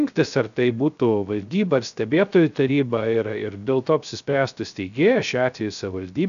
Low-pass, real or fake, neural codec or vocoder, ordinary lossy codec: 7.2 kHz; fake; codec, 16 kHz, 0.3 kbps, FocalCodec; AAC, 96 kbps